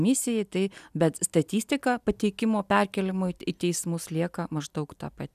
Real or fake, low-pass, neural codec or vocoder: real; 14.4 kHz; none